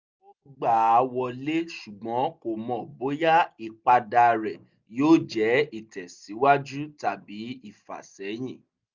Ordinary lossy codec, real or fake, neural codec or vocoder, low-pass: Opus, 64 kbps; real; none; 7.2 kHz